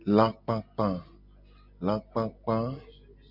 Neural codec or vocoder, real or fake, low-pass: none; real; 5.4 kHz